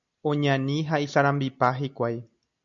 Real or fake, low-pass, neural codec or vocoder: real; 7.2 kHz; none